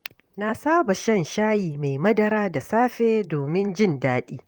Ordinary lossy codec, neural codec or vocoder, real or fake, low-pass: Opus, 32 kbps; vocoder, 44.1 kHz, 128 mel bands, Pupu-Vocoder; fake; 19.8 kHz